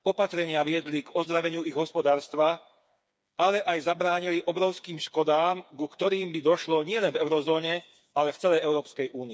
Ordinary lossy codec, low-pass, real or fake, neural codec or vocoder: none; none; fake; codec, 16 kHz, 4 kbps, FreqCodec, smaller model